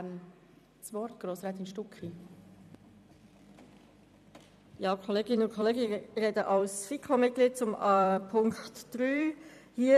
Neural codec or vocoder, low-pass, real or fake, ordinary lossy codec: vocoder, 44.1 kHz, 128 mel bands every 256 samples, BigVGAN v2; 14.4 kHz; fake; none